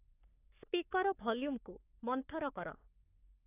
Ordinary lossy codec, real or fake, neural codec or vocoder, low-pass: AAC, 32 kbps; fake; codec, 44.1 kHz, 3.4 kbps, Pupu-Codec; 3.6 kHz